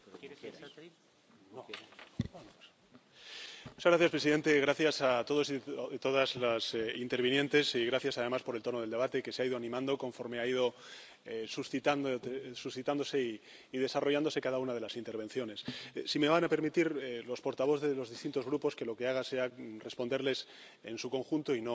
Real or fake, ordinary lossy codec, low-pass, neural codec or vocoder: real; none; none; none